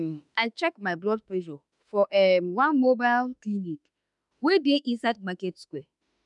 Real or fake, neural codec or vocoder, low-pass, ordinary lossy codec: fake; autoencoder, 48 kHz, 32 numbers a frame, DAC-VAE, trained on Japanese speech; 10.8 kHz; none